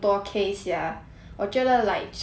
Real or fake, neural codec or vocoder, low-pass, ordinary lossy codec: real; none; none; none